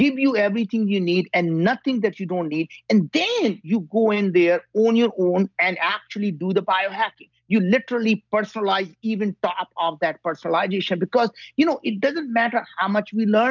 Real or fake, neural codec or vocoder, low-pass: real; none; 7.2 kHz